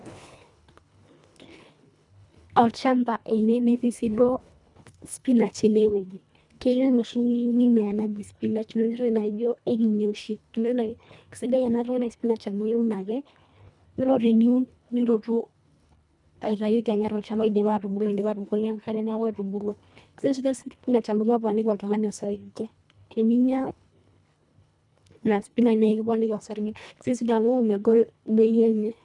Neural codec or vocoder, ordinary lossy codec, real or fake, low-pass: codec, 24 kHz, 1.5 kbps, HILCodec; none; fake; 10.8 kHz